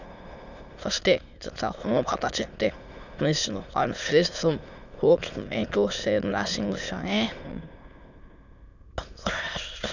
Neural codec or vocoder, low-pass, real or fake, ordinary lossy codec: autoencoder, 22.05 kHz, a latent of 192 numbers a frame, VITS, trained on many speakers; 7.2 kHz; fake; none